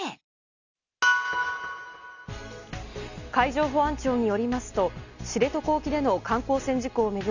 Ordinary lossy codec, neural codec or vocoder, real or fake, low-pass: none; none; real; 7.2 kHz